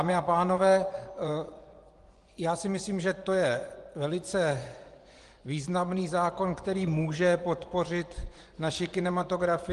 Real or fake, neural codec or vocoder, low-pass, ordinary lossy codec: fake; vocoder, 24 kHz, 100 mel bands, Vocos; 10.8 kHz; Opus, 24 kbps